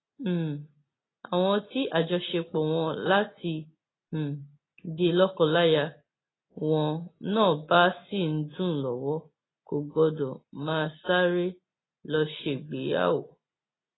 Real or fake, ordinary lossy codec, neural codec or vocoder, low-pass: real; AAC, 16 kbps; none; 7.2 kHz